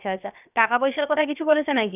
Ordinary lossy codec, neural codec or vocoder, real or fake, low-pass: none; codec, 16 kHz, about 1 kbps, DyCAST, with the encoder's durations; fake; 3.6 kHz